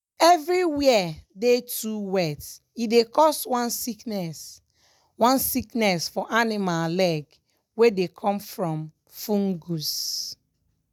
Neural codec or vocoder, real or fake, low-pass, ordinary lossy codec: none; real; none; none